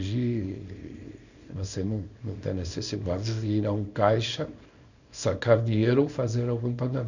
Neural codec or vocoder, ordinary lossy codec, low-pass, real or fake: codec, 24 kHz, 0.9 kbps, WavTokenizer, small release; none; 7.2 kHz; fake